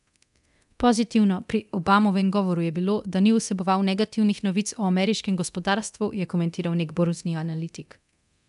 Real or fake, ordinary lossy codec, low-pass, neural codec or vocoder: fake; none; 10.8 kHz; codec, 24 kHz, 0.9 kbps, DualCodec